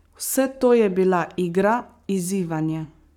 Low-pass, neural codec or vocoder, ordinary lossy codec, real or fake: 19.8 kHz; codec, 44.1 kHz, 7.8 kbps, Pupu-Codec; none; fake